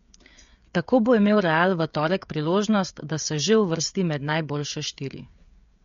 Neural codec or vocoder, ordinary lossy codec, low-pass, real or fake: codec, 16 kHz, 16 kbps, FreqCodec, smaller model; MP3, 48 kbps; 7.2 kHz; fake